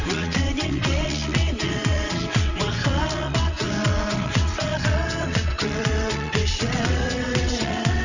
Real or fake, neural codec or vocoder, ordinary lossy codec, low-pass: fake; vocoder, 22.05 kHz, 80 mel bands, WaveNeXt; none; 7.2 kHz